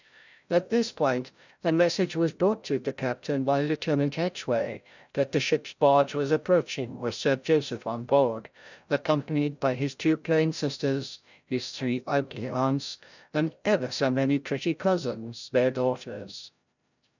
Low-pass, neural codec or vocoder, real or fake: 7.2 kHz; codec, 16 kHz, 0.5 kbps, FreqCodec, larger model; fake